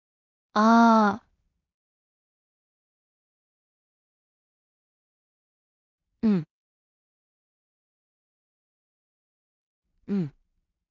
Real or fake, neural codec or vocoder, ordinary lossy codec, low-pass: fake; codec, 16 kHz in and 24 kHz out, 0.4 kbps, LongCat-Audio-Codec, two codebook decoder; none; 7.2 kHz